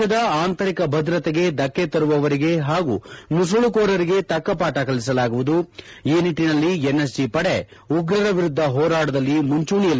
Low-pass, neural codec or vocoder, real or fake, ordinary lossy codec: none; none; real; none